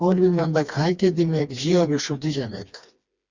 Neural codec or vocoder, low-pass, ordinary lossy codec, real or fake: codec, 16 kHz, 2 kbps, FreqCodec, smaller model; 7.2 kHz; Opus, 64 kbps; fake